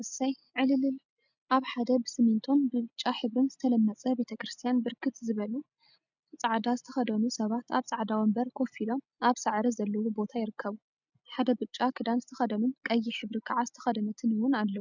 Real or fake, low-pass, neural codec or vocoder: real; 7.2 kHz; none